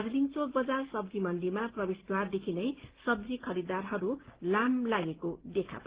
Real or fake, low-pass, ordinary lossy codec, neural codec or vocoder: real; 3.6 kHz; Opus, 16 kbps; none